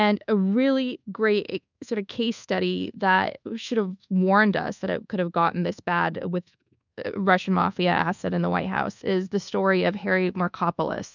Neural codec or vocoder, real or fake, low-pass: codec, 24 kHz, 1.2 kbps, DualCodec; fake; 7.2 kHz